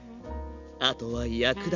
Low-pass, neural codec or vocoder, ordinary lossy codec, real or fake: 7.2 kHz; none; none; real